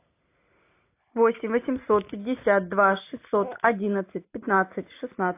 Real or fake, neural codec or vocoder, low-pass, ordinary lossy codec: real; none; 3.6 kHz; AAC, 24 kbps